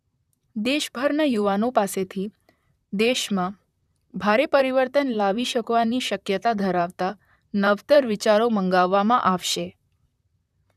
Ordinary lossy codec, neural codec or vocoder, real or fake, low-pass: none; vocoder, 44.1 kHz, 128 mel bands, Pupu-Vocoder; fake; 14.4 kHz